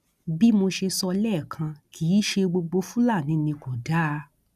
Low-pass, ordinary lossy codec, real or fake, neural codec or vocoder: 14.4 kHz; none; real; none